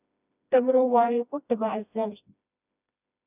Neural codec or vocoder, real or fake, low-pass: codec, 16 kHz, 1 kbps, FreqCodec, smaller model; fake; 3.6 kHz